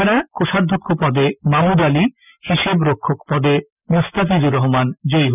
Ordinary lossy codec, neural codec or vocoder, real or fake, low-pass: none; none; real; 3.6 kHz